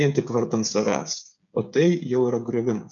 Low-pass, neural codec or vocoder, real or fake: 7.2 kHz; codec, 16 kHz, 8 kbps, FreqCodec, smaller model; fake